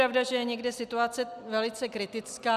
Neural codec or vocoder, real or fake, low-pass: none; real; 14.4 kHz